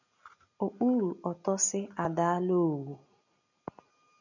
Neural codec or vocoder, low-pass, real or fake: none; 7.2 kHz; real